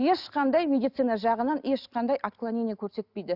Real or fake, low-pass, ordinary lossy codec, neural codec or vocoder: real; 5.4 kHz; Opus, 64 kbps; none